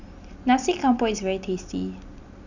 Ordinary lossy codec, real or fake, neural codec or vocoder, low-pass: none; real; none; 7.2 kHz